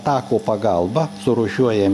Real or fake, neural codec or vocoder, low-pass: real; none; 14.4 kHz